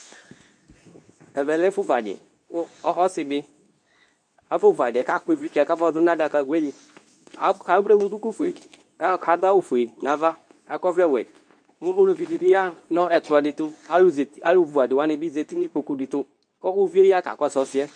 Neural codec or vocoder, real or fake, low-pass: codec, 24 kHz, 0.9 kbps, WavTokenizer, medium speech release version 2; fake; 9.9 kHz